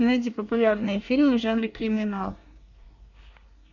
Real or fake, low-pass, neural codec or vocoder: fake; 7.2 kHz; codec, 24 kHz, 1 kbps, SNAC